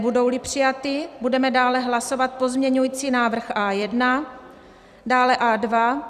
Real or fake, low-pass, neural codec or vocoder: fake; 14.4 kHz; vocoder, 44.1 kHz, 128 mel bands every 256 samples, BigVGAN v2